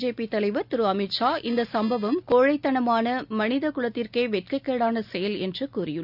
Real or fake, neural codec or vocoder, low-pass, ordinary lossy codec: real; none; 5.4 kHz; none